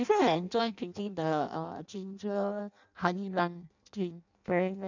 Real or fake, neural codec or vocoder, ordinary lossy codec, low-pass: fake; codec, 16 kHz in and 24 kHz out, 0.6 kbps, FireRedTTS-2 codec; none; 7.2 kHz